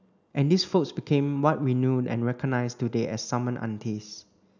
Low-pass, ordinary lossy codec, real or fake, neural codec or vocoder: 7.2 kHz; none; real; none